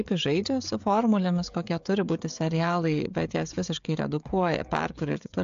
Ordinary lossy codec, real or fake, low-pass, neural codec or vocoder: AAC, 64 kbps; fake; 7.2 kHz; codec, 16 kHz, 16 kbps, FreqCodec, smaller model